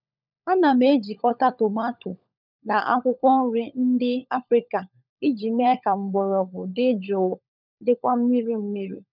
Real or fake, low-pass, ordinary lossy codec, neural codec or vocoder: fake; 5.4 kHz; none; codec, 16 kHz, 16 kbps, FunCodec, trained on LibriTTS, 50 frames a second